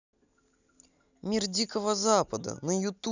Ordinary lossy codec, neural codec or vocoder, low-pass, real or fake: none; none; 7.2 kHz; real